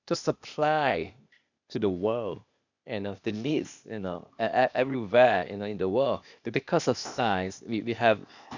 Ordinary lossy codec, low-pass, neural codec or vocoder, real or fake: none; 7.2 kHz; codec, 16 kHz, 0.8 kbps, ZipCodec; fake